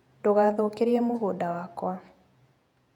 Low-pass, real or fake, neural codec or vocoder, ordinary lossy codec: 19.8 kHz; fake; vocoder, 48 kHz, 128 mel bands, Vocos; none